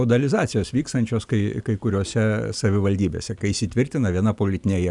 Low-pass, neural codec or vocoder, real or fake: 10.8 kHz; none; real